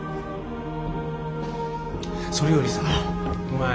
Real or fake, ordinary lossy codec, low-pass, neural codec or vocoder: real; none; none; none